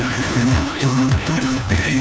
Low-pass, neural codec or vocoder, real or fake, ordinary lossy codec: none; codec, 16 kHz, 1 kbps, FunCodec, trained on LibriTTS, 50 frames a second; fake; none